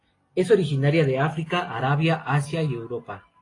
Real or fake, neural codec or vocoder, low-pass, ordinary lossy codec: real; none; 10.8 kHz; AAC, 32 kbps